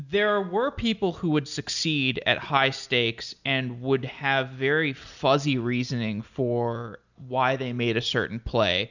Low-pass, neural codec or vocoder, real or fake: 7.2 kHz; none; real